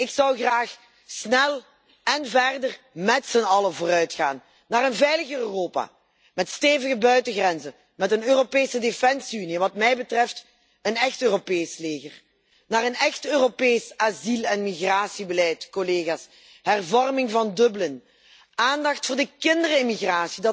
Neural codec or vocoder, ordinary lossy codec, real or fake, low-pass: none; none; real; none